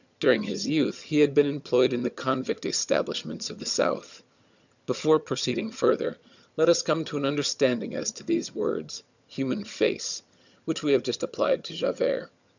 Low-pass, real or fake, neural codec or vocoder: 7.2 kHz; fake; vocoder, 22.05 kHz, 80 mel bands, HiFi-GAN